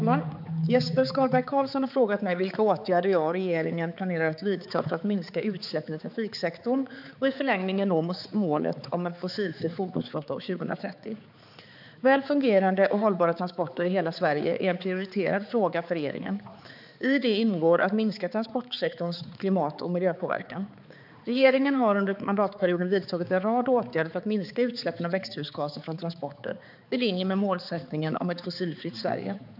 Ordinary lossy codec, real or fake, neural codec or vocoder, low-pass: none; fake; codec, 16 kHz, 4 kbps, X-Codec, HuBERT features, trained on balanced general audio; 5.4 kHz